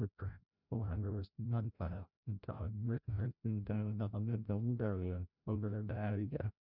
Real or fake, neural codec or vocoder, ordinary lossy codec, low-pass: fake; codec, 16 kHz, 0.5 kbps, FreqCodec, larger model; none; 5.4 kHz